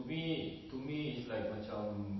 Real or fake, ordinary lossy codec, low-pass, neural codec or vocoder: real; MP3, 24 kbps; 7.2 kHz; none